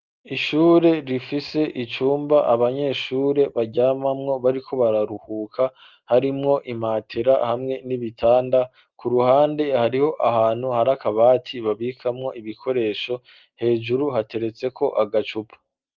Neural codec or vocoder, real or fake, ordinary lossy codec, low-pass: none; real; Opus, 32 kbps; 7.2 kHz